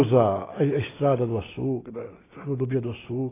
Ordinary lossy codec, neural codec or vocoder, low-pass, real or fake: AAC, 16 kbps; none; 3.6 kHz; real